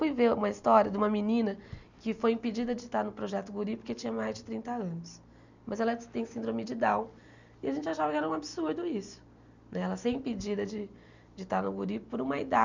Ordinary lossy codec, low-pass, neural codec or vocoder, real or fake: none; 7.2 kHz; none; real